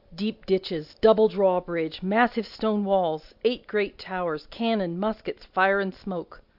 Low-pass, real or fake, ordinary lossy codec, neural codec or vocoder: 5.4 kHz; real; AAC, 48 kbps; none